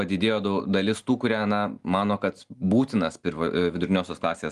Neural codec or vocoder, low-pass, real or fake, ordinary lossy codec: none; 10.8 kHz; real; Opus, 24 kbps